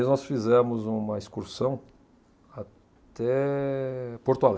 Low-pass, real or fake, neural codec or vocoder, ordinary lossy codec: none; real; none; none